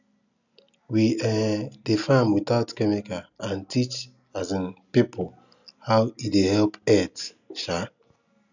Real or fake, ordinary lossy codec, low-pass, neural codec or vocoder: real; none; 7.2 kHz; none